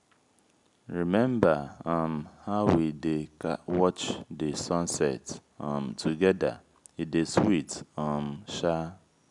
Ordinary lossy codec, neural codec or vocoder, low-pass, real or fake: none; none; 10.8 kHz; real